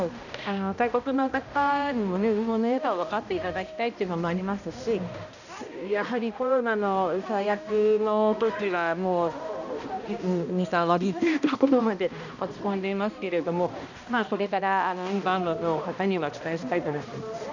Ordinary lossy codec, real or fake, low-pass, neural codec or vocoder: none; fake; 7.2 kHz; codec, 16 kHz, 1 kbps, X-Codec, HuBERT features, trained on balanced general audio